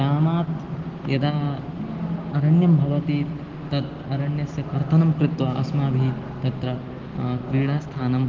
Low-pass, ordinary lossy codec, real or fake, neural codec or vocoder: 7.2 kHz; Opus, 16 kbps; real; none